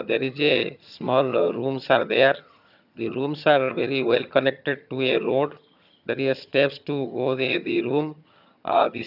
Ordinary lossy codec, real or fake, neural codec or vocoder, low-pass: none; fake; vocoder, 22.05 kHz, 80 mel bands, HiFi-GAN; 5.4 kHz